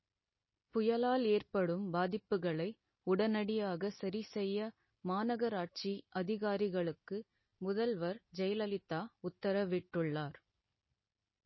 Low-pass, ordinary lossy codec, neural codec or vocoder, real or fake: 7.2 kHz; MP3, 24 kbps; none; real